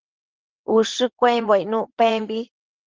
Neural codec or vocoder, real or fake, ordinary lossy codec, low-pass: vocoder, 22.05 kHz, 80 mel bands, WaveNeXt; fake; Opus, 16 kbps; 7.2 kHz